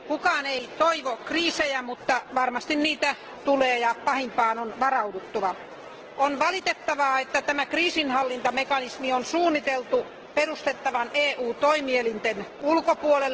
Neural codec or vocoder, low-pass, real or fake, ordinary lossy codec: none; 7.2 kHz; real; Opus, 16 kbps